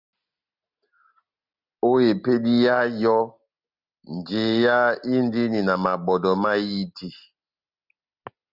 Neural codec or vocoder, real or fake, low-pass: none; real; 5.4 kHz